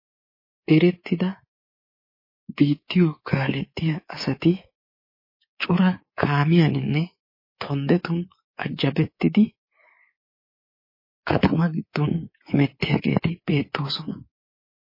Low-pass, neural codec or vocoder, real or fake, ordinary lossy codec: 5.4 kHz; codec, 24 kHz, 3.1 kbps, DualCodec; fake; MP3, 24 kbps